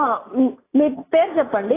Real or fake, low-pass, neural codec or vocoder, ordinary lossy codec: real; 3.6 kHz; none; AAC, 16 kbps